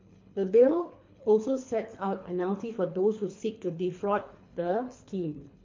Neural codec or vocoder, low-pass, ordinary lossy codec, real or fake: codec, 24 kHz, 3 kbps, HILCodec; 7.2 kHz; MP3, 48 kbps; fake